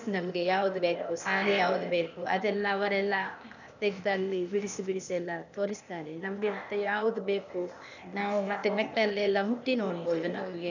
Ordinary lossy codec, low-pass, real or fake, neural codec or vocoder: none; 7.2 kHz; fake; codec, 16 kHz, 0.8 kbps, ZipCodec